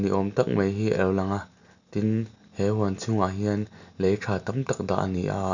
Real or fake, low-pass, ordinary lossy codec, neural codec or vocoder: real; 7.2 kHz; none; none